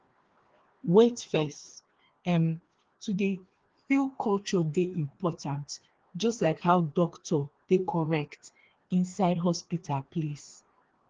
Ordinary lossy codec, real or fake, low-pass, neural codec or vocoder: Opus, 16 kbps; fake; 7.2 kHz; codec, 16 kHz, 2 kbps, FreqCodec, larger model